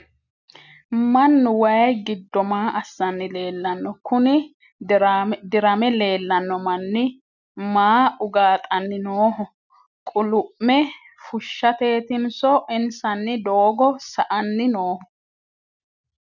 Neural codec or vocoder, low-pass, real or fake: none; 7.2 kHz; real